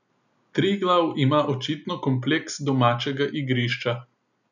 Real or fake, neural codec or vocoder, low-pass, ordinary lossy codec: real; none; 7.2 kHz; none